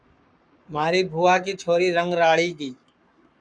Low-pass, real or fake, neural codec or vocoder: 9.9 kHz; fake; codec, 44.1 kHz, 7.8 kbps, Pupu-Codec